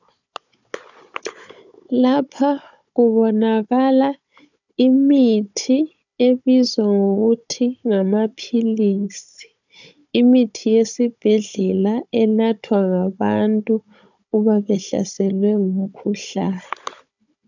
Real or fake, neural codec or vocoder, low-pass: fake; codec, 16 kHz, 16 kbps, FunCodec, trained on Chinese and English, 50 frames a second; 7.2 kHz